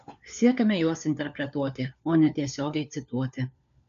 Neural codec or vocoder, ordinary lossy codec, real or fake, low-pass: codec, 16 kHz, 4 kbps, FunCodec, trained on LibriTTS, 50 frames a second; AAC, 96 kbps; fake; 7.2 kHz